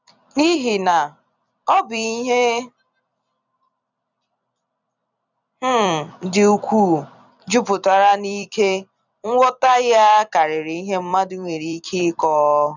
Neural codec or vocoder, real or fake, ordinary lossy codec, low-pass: vocoder, 44.1 kHz, 128 mel bands every 256 samples, BigVGAN v2; fake; none; 7.2 kHz